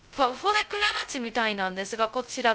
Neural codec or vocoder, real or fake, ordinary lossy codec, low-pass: codec, 16 kHz, 0.2 kbps, FocalCodec; fake; none; none